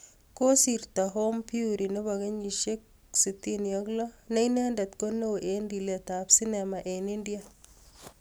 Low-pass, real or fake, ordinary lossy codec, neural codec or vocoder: none; real; none; none